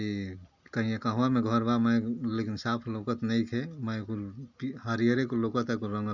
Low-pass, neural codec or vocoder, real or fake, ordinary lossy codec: 7.2 kHz; none; real; none